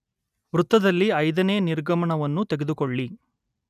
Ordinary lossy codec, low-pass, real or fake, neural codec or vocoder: none; 14.4 kHz; real; none